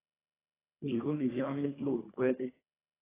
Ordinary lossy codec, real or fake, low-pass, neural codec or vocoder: AAC, 16 kbps; fake; 3.6 kHz; codec, 24 kHz, 1.5 kbps, HILCodec